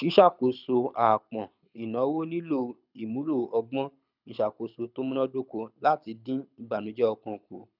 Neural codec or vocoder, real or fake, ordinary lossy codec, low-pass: codec, 24 kHz, 6 kbps, HILCodec; fake; none; 5.4 kHz